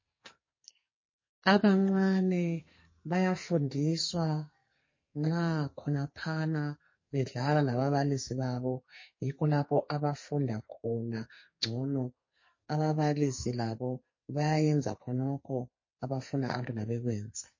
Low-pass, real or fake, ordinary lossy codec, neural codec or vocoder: 7.2 kHz; fake; MP3, 32 kbps; codec, 44.1 kHz, 2.6 kbps, SNAC